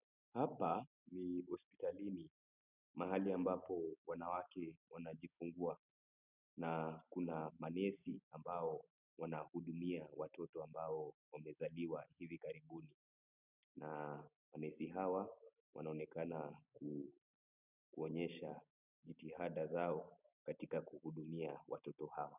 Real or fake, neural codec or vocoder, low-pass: real; none; 3.6 kHz